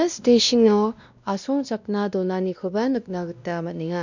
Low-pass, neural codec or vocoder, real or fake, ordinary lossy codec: 7.2 kHz; codec, 16 kHz, 1 kbps, X-Codec, WavLM features, trained on Multilingual LibriSpeech; fake; none